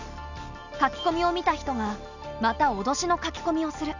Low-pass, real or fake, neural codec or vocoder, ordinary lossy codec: 7.2 kHz; real; none; none